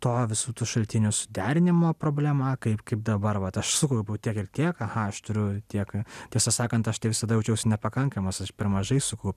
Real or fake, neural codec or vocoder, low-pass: real; none; 14.4 kHz